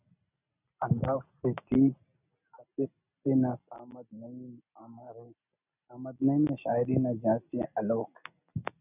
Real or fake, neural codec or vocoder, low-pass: real; none; 3.6 kHz